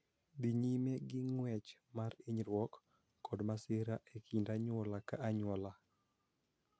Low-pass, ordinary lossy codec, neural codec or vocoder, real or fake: none; none; none; real